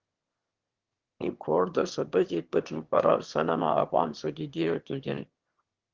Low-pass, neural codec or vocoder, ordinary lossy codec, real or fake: 7.2 kHz; autoencoder, 22.05 kHz, a latent of 192 numbers a frame, VITS, trained on one speaker; Opus, 16 kbps; fake